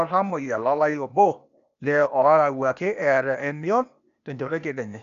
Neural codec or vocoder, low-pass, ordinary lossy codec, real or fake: codec, 16 kHz, 0.8 kbps, ZipCodec; 7.2 kHz; none; fake